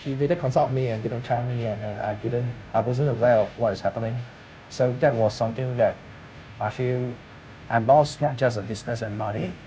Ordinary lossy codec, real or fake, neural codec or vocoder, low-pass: none; fake; codec, 16 kHz, 0.5 kbps, FunCodec, trained on Chinese and English, 25 frames a second; none